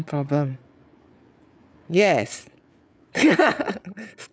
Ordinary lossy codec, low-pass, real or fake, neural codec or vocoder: none; none; fake; codec, 16 kHz, 8 kbps, FreqCodec, larger model